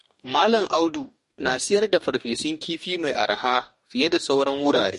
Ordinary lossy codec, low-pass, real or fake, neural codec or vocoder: MP3, 48 kbps; 14.4 kHz; fake; codec, 44.1 kHz, 2.6 kbps, DAC